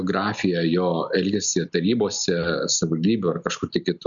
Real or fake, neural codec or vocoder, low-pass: real; none; 7.2 kHz